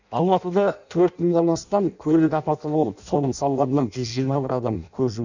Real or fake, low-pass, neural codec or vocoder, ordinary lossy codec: fake; 7.2 kHz; codec, 16 kHz in and 24 kHz out, 0.6 kbps, FireRedTTS-2 codec; none